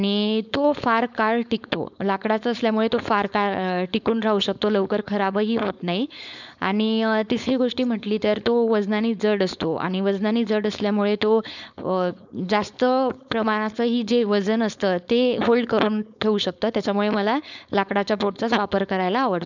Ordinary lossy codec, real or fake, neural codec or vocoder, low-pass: none; fake; codec, 16 kHz, 4.8 kbps, FACodec; 7.2 kHz